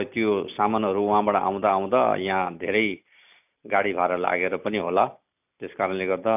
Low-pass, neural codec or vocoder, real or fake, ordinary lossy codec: 3.6 kHz; none; real; none